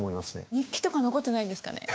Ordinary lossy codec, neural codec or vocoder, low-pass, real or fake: none; codec, 16 kHz, 6 kbps, DAC; none; fake